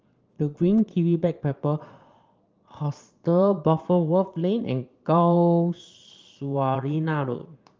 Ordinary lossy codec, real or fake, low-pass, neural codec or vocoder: Opus, 24 kbps; fake; 7.2 kHz; vocoder, 22.05 kHz, 80 mel bands, Vocos